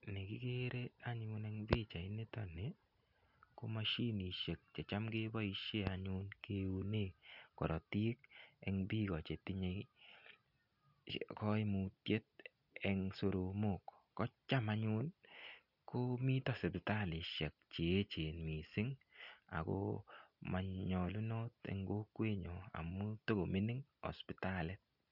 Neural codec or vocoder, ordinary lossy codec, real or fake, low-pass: none; none; real; 5.4 kHz